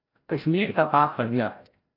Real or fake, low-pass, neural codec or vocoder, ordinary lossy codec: fake; 5.4 kHz; codec, 16 kHz, 0.5 kbps, FreqCodec, larger model; AAC, 32 kbps